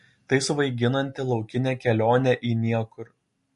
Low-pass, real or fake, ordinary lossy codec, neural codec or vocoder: 14.4 kHz; real; MP3, 48 kbps; none